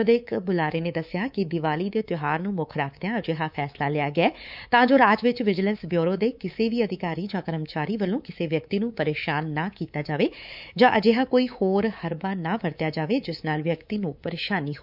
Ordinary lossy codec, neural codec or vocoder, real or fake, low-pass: none; codec, 24 kHz, 3.1 kbps, DualCodec; fake; 5.4 kHz